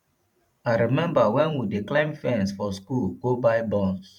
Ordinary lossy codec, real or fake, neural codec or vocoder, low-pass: none; fake; vocoder, 44.1 kHz, 128 mel bands every 512 samples, BigVGAN v2; 19.8 kHz